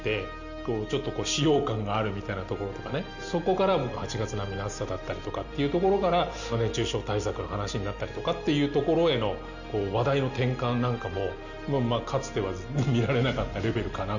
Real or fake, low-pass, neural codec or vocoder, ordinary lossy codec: real; 7.2 kHz; none; none